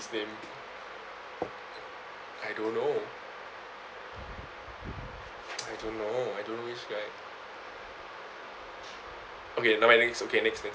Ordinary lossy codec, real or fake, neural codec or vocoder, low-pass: none; real; none; none